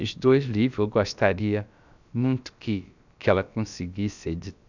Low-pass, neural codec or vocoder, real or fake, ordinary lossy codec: 7.2 kHz; codec, 16 kHz, about 1 kbps, DyCAST, with the encoder's durations; fake; none